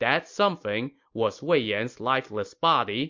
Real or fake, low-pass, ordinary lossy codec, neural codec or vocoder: real; 7.2 kHz; MP3, 48 kbps; none